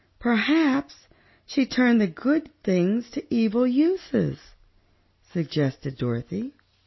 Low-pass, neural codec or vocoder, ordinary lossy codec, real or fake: 7.2 kHz; none; MP3, 24 kbps; real